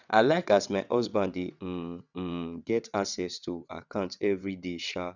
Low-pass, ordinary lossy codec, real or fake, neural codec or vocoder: 7.2 kHz; none; fake; vocoder, 22.05 kHz, 80 mel bands, WaveNeXt